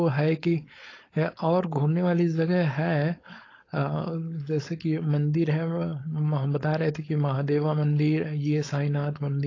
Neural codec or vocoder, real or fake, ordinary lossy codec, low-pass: codec, 16 kHz, 4.8 kbps, FACodec; fake; AAC, 32 kbps; 7.2 kHz